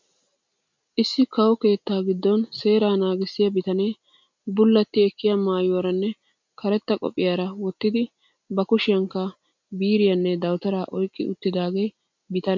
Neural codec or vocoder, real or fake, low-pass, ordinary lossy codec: none; real; 7.2 kHz; MP3, 64 kbps